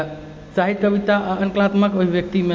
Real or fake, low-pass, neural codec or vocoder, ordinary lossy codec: real; none; none; none